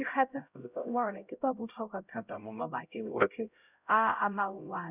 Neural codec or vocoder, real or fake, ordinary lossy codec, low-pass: codec, 16 kHz, 0.5 kbps, X-Codec, HuBERT features, trained on LibriSpeech; fake; none; 3.6 kHz